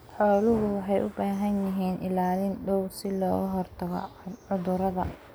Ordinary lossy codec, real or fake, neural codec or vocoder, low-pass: none; real; none; none